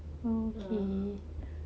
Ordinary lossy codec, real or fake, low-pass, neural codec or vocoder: none; real; none; none